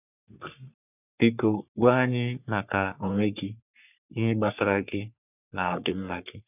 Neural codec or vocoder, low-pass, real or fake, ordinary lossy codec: codec, 44.1 kHz, 3.4 kbps, Pupu-Codec; 3.6 kHz; fake; none